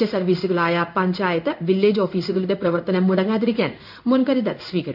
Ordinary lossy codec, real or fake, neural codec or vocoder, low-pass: none; fake; codec, 16 kHz in and 24 kHz out, 1 kbps, XY-Tokenizer; 5.4 kHz